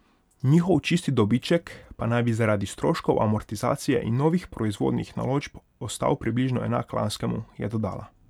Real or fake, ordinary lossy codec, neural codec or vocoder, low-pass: real; none; none; 19.8 kHz